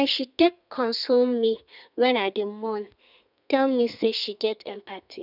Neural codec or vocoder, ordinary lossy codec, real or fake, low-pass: codec, 32 kHz, 1.9 kbps, SNAC; none; fake; 5.4 kHz